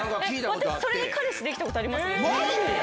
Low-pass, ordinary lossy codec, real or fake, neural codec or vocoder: none; none; real; none